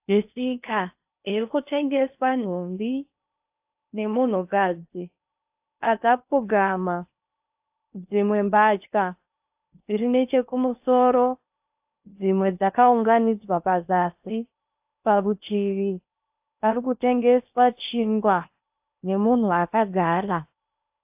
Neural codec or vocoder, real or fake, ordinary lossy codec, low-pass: codec, 16 kHz in and 24 kHz out, 0.6 kbps, FocalCodec, streaming, 4096 codes; fake; AAC, 32 kbps; 3.6 kHz